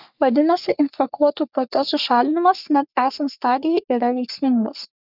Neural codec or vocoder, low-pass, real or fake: codec, 44.1 kHz, 3.4 kbps, Pupu-Codec; 5.4 kHz; fake